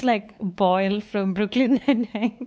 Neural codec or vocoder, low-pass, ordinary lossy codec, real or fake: codec, 16 kHz, 4 kbps, X-Codec, WavLM features, trained on Multilingual LibriSpeech; none; none; fake